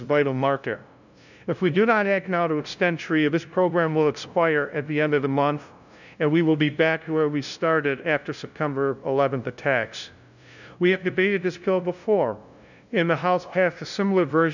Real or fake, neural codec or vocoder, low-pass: fake; codec, 16 kHz, 0.5 kbps, FunCodec, trained on LibriTTS, 25 frames a second; 7.2 kHz